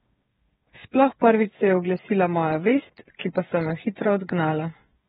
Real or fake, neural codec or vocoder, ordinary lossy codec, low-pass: fake; codec, 16 kHz, 8 kbps, FreqCodec, smaller model; AAC, 16 kbps; 7.2 kHz